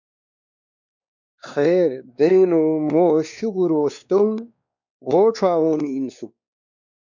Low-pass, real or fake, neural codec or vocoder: 7.2 kHz; fake; codec, 16 kHz, 2 kbps, X-Codec, WavLM features, trained on Multilingual LibriSpeech